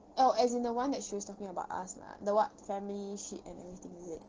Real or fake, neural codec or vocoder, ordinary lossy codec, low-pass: real; none; Opus, 16 kbps; 7.2 kHz